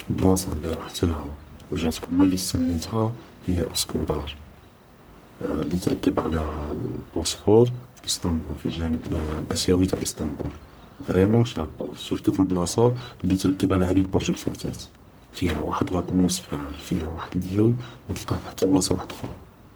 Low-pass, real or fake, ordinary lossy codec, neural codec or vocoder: none; fake; none; codec, 44.1 kHz, 1.7 kbps, Pupu-Codec